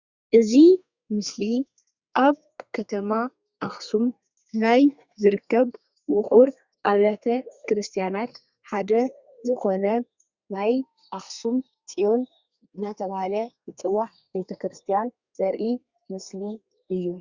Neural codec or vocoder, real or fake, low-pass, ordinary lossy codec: codec, 32 kHz, 1.9 kbps, SNAC; fake; 7.2 kHz; Opus, 64 kbps